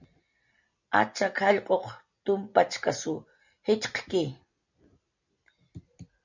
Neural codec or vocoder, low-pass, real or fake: none; 7.2 kHz; real